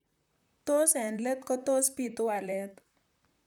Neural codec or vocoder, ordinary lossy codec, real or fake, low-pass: vocoder, 44.1 kHz, 128 mel bands, Pupu-Vocoder; none; fake; 19.8 kHz